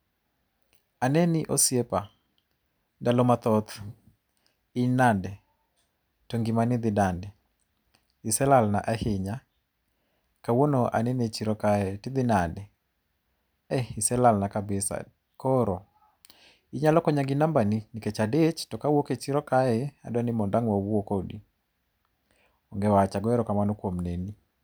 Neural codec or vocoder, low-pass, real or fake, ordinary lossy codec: none; none; real; none